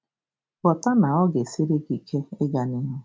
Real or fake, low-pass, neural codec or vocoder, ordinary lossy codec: real; none; none; none